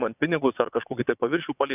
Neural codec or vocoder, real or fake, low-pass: vocoder, 22.05 kHz, 80 mel bands, WaveNeXt; fake; 3.6 kHz